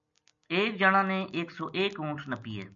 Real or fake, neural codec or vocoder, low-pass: real; none; 7.2 kHz